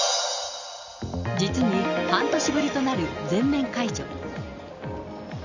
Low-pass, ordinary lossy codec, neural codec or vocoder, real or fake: 7.2 kHz; none; none; real